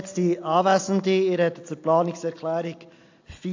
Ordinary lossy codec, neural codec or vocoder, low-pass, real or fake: AAC, 48 kbps; none; 7.2 kHz; real